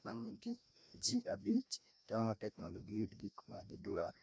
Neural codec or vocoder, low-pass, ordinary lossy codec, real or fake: codec, 16 kHz, 1 kbps, FreqCodec, larger model; none; none; fake